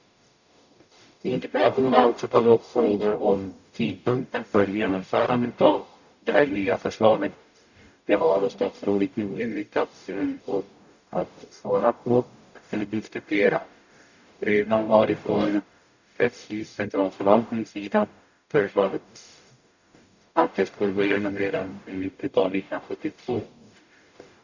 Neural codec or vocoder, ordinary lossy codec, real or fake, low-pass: codec, 44.1 kHz, 0.9 kbps, DAC; none; fake; 7.2 kHz